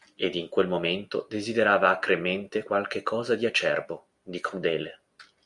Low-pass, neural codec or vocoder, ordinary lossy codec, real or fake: 10.8 kHz; none; Opus, 64 kbps; real